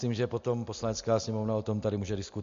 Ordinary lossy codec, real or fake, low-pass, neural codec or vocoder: MP3, 48 kbps; real; 7.2 kHz; none